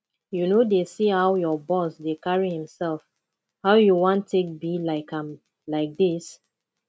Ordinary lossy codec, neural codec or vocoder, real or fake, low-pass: none; none; real; none